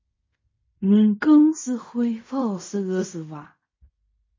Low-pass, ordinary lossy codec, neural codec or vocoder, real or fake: 7.2 kHz; MP3, 32 kbps; codec, 16 kHz in and 24 kHz out, 0.4 kbps, LongCat-Audio-Codec, fine tuned four codebook decoder; fake